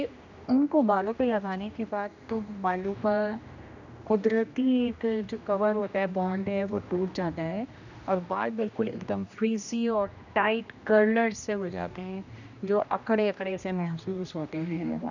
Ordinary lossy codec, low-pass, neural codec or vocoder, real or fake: none; 7.2 kHz; codec, 16 kHz, 1 kbps, X-Codec, HuBERT features, trained on general audio; fake